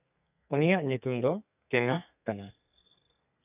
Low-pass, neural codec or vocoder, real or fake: 3.6 kHz; codec, 32 kHz, 1.9 kbps, SNAC; fake